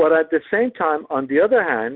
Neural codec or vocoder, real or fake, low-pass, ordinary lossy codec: none; real; 5.4 kHz; Opus, 32 kbps